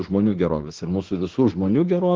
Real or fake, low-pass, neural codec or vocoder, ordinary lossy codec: fake; 7.2 kHz; autoencoder, 48 kHz, 32 numbers a frame, DAC-VAE, trained on Japanese speech; Opus, 16 kbps